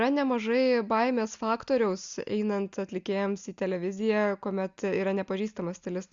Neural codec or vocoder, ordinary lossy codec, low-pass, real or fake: none; Opus, 64 kbps; 7.2 kHz; real